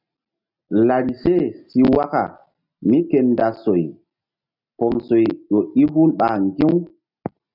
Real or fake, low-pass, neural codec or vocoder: real; 5.4 kHz; none